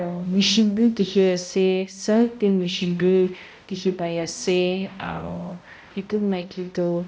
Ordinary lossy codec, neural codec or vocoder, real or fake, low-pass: none; codec, 16 kHz, 0.5 kbps, X-Codec, HuBERT features, trained on balanced general audio; fake; none